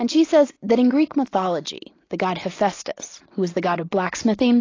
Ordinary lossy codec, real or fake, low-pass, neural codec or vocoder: AAC, 32 kbps; real; 7.2 kHz; none